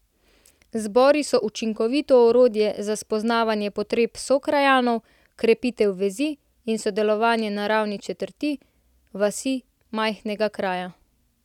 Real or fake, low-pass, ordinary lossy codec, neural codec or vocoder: real; 19.8 kHz; none; none